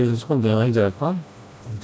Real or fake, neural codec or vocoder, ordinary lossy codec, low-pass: fake; codec, 16 kHz, 1 kbps, FreqCodec, smaller model; none; none